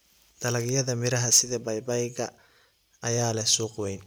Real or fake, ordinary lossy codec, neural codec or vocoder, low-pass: real; none; none; none